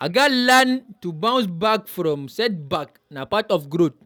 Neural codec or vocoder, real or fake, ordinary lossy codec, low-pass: none; real; none; none